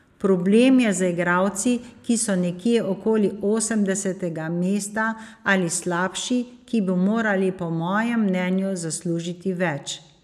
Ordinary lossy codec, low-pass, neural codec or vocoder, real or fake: none; 14.4 kHz; none; real